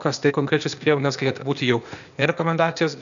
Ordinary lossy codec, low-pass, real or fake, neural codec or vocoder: MP3, 96 kbps; 7.2 kHz; fake; codec, 16 kHz, 0.8 kbps, ZipCodec